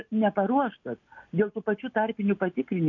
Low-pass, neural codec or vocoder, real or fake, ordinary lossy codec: 7.2 kHz; none; real; MP3, 64 kbps